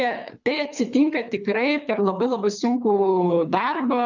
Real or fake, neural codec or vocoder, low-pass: fake; codec, 24 kHz, 3 kbps, HILCodec; 7.2 kHz